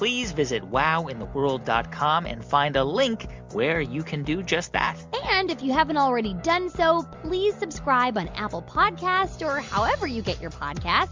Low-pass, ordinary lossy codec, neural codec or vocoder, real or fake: 7.2 kHz; MP3, 64 kbps; none; real